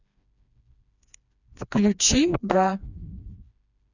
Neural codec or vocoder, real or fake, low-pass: codec, 16 kHz, 2 kbps, FreqCodec, smaller model; fake; 7.2 kHz